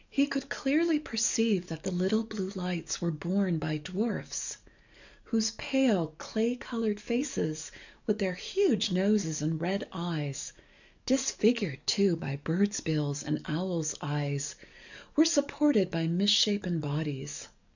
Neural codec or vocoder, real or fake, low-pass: codec, 44.1 kHz, 7.8 kbps, DAC; fake; 7.2 kHz